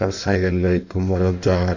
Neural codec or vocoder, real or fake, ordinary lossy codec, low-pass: codec, 16 kHz in and 24 kHz out, 1.1 kbps, FireRedTTS-2 codec; fake; none; 7.2 kHz